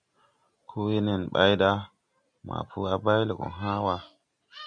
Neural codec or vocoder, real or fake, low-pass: none; real; 9.9 kHz